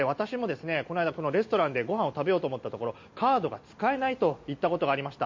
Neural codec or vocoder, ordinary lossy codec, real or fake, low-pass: none; MP3, 48 kbps; real; 7.2 kHz